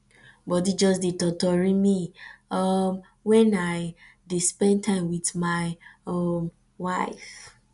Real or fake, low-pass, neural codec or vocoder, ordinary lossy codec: real; 10.8 kHz; none; none